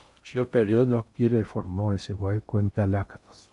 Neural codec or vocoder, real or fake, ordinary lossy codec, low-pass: codec, 16 kHz in and 24 kHz out, 0.6 kbps, FocalCodec, streaming, 2048 codes; fake; MP3, 96 kbps; 10.8 kHz